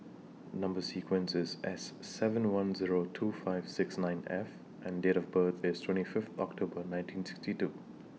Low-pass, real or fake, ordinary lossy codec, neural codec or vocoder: none; real; none; none